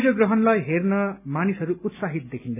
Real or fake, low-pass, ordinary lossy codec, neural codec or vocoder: real; 3.6 kHz; none; none